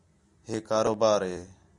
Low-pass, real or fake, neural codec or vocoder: 10.8 kHz; real; none